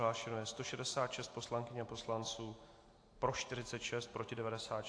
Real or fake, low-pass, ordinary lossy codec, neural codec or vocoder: real; 9.9 kHz; AAC, 48 kbps; none